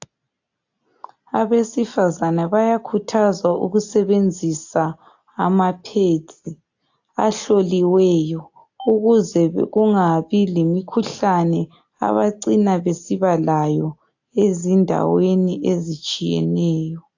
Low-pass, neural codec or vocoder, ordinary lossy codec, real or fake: 7.2 kHz; none; AAC, 48 kbps; real